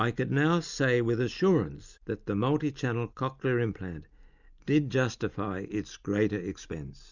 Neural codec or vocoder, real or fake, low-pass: none; real; 7.2 kHz